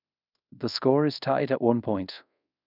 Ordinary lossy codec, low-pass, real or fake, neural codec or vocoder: none; 5.4 kHz; fake; codec, 24 kHz, 0.5 kbps, DualCodec